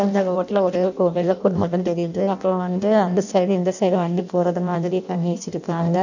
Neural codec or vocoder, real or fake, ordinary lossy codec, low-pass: codec, 16 kHz in and 24 kHz out, 0.6 kbps, FireRedTTS-2 codec; fake; none; 7.2 kHz